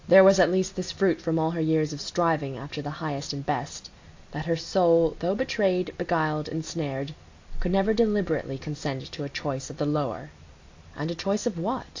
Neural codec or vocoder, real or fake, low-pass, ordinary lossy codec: none; real; 7.2 kHz; AAC, 48 kbps